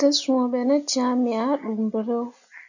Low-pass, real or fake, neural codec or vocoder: 7.2 kHz; real; none